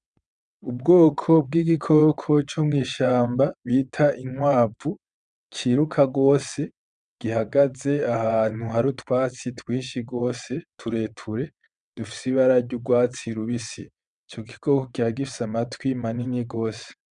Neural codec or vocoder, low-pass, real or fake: vocoder, 22.05 kHz, 80 mel bands, WaveNeXt; 9.9 kHz; fake